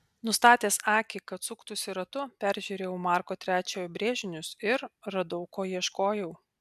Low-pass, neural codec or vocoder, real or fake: 14.4 kHz; none; real